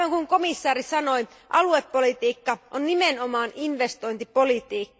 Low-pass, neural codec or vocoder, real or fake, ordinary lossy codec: none; none; real; none